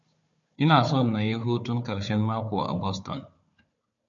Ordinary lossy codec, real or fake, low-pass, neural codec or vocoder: MP3, 48 kbps; fake; 7.2 kHz; codec, 16 kHz, 4 kbps, FunCodec, trained on Chinese and English, 50 frames a second